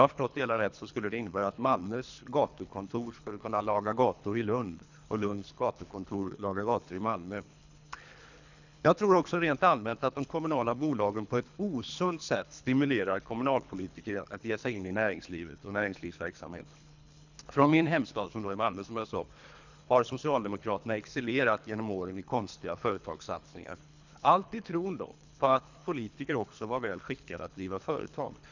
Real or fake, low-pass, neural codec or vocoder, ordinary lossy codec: fake; 7.2 kHz; codec, 24 kHz, 3 kbps, HILCodec; none